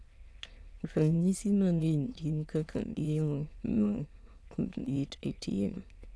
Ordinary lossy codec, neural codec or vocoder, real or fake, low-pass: none; autoencoder, 22.05 kHz, a latent of 192 numbers a frame, VITS, trained on many speakers; fake; none